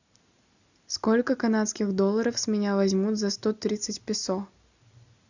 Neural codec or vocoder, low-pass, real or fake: none; 7.2 kHz; real